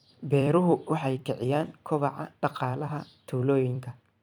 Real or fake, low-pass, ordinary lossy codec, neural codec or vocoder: fake; 19.8 kHz; none; vocoder, 44.1 kHz, 128 mel bands every 256 samples, BigVGAN v2